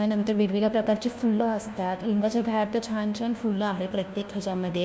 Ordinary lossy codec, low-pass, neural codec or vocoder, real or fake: none; none; codec, 16 kHz, 1 kbps, FunCodec, trained on LibriTTS, 50 frames a second; fake